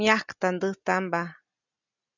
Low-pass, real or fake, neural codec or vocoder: 7.2 kHz; real; none